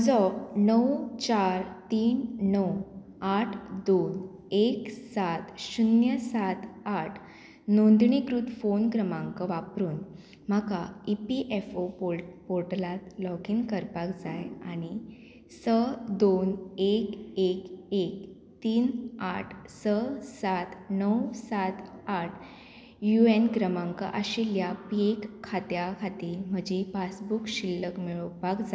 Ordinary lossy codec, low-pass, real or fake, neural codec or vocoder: none; none; real; none